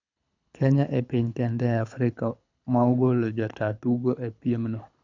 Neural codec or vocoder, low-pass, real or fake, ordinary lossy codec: codec, 24 kHz, 6 kbps, HILCodec; 7.2 kHz; fake; none